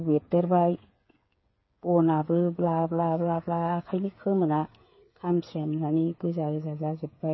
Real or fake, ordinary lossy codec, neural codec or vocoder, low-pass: fake; MP3, 24 kbps; codec, 16 kHz, 8 kbps, FreqCodec, smaller model; 7.2 kHz